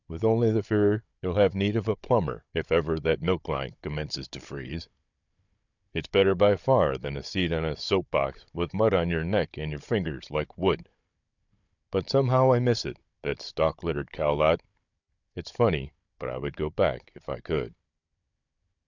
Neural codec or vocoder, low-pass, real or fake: codec, 16 kHz, 16 kbps, FunCodec, trained on Chinese and English, 50 frames a second; 7.2 kHz; fake